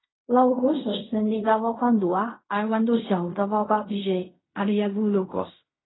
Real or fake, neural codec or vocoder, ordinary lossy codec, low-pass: fake; codec, 16 kHz in and 24 kHz out, 0.4 kbps, LongCat-Audio-Codec, fine tuned four codebook decoder; AAC, 16 kbps; 7.2 kHz